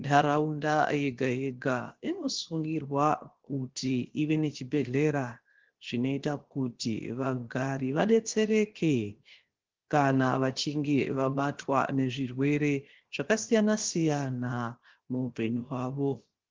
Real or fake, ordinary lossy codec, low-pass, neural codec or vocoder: fake; Opus, 16 kbps; 7.2 kHz; codec, 16 kHz, 0.7 kbps, FocalCodec